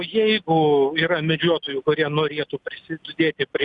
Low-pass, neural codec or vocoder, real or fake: 10.8 kHz; none; real